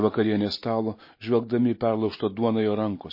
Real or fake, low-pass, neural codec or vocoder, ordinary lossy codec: real; 5.4 kHz; none; MP3, 24 kbps